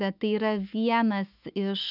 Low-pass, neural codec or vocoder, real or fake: 5.4 kHz; autoencoder, 48 kHz, 128 numbers a frame, DAC-VAE, trained on Japanese speech; fake